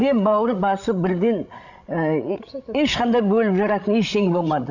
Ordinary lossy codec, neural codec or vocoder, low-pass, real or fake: none; codec, 16 kHz, 16 kbps, FreqCodec, larger model; 7.2 kHz; fake